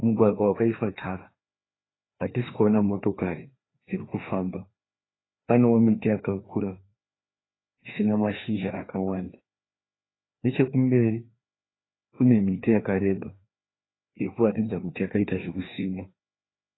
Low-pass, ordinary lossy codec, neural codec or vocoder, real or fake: 7.2 kHz; AAC, 16 kbps; codec, 16 kHz, 2 kbps, FreqCodec, larger model; fake